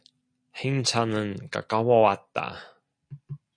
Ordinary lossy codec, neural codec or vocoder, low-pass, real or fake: MP3, 48 kbps; none; 9.9 kHz; real